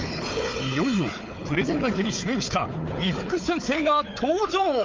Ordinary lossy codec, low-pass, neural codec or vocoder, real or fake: Opus, 32 kbps; 7.2 kHz; codec, 16 kHz, 4 kbps, FunCodec, trained on Chinese and English, 50 frames a second; fake